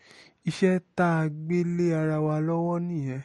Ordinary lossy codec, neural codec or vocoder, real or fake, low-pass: MP3, 48 kbps; none; real; 19.8 kHz